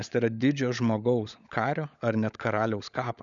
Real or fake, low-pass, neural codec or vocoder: fake; 7.2 kHz; codec, 16 kHz, 16 kbps, FunCodec, trained on LibriTTS, 50 frames a second